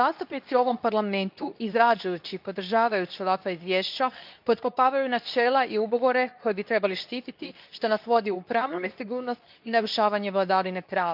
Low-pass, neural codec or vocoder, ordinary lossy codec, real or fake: 5.4 kHz; codec, 24 kHz, 0.9 kbps, WavTokenizer, medium speech release version 2; none; fake